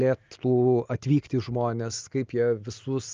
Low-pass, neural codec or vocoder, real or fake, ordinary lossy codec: 7.2 kHz; none; real; Opus, 24 kbps